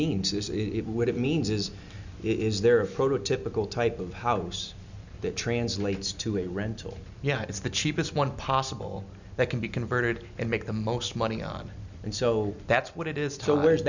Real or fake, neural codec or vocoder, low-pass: real; none; 7.2 kHz